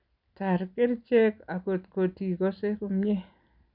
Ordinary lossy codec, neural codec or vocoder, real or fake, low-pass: none; none; real; 5.4 kHz